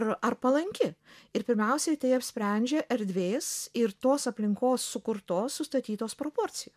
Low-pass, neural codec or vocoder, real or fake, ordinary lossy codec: 14.4 kHz; none; real; MP3, 96 kbps